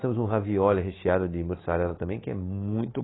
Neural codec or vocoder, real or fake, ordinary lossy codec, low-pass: codec, 24 kHz, 3.1 kbps, DualCodec; fake; AAC, 16 kbps; 7.2 kHz